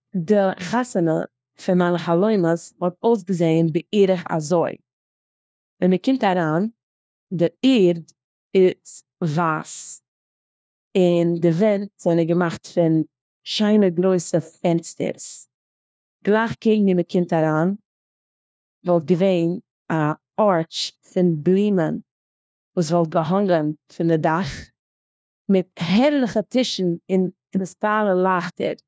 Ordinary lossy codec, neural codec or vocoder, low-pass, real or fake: none; codec, 16 kHz, 1 kbps, FunCodec, trained on LibriTTS, 50 frames a second; none; fake